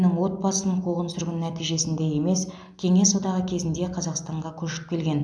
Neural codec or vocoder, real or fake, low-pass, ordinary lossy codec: none; real; none; none